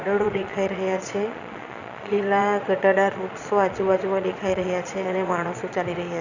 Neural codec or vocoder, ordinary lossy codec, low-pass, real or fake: vocoder, 22.05 kHz, 80 mel bands, Vocos; none; 7.2 kHz; fake